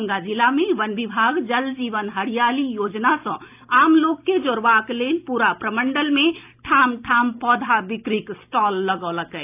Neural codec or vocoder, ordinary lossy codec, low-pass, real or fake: none; AAC, 32 kbps; 3.6 kHz; real